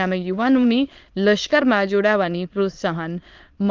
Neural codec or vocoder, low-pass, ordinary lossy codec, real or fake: autoencoder, 22.05 kHz, a latent of 192 numbers a frame, VITS, trained on many speakers; 7.2 kHz; Opus, 32 kbps; fake